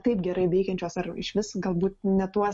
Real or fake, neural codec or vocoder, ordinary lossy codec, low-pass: real; none; MP3, 48 kbps; 10.8 kHz